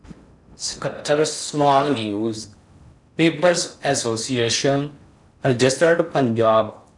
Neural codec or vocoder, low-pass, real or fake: codec, 16 kHz in and 24 kHz out, 0.6 kbps, FocalCodec, streaming, 4096 codes; 10.8 kHz; fake